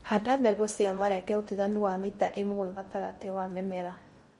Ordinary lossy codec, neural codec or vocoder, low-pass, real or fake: MP3, 48 kbps; codec, 16 kHz in and 24 kHz out, 0.6 kbps, FocalCodec, streaming, 2048 codes; 10.8 kHz; fake